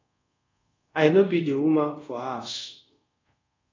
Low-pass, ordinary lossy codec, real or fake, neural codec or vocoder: 7.2 kHz; AAC, 32 kbps; fake; codec, 24 kHz, 0.5 kbps, DualCodec